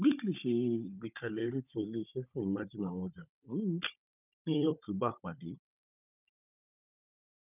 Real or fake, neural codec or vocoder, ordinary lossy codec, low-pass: fake; codec, 16 kHz, 16 kbps, FunCodec, trained on Chinese and English, 50 frames a second; none; 3.6 kHz